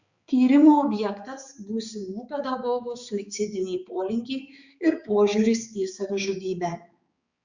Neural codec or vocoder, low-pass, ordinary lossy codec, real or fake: codec, 16 kHz, 4 kbps, X-Codec, HuBERT features, trained on balanced general audio; 7.2 kHz; Opus, 64 kbps; fake